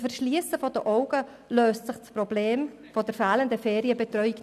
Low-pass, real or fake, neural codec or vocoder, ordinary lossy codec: 14.4 kHz; real; none; none